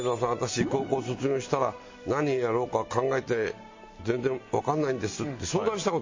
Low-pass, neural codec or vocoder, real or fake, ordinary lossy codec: 7.2 kHz; none; real; MP3, 32 kbps